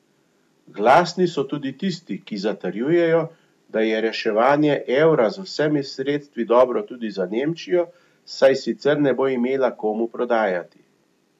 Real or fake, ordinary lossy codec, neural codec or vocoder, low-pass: real; AAC, 96 kbps; none; 14.4 kHz